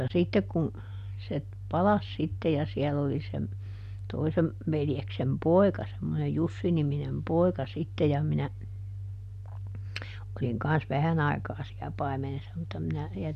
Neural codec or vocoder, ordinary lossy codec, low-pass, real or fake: none; none; 14.4 kHz; real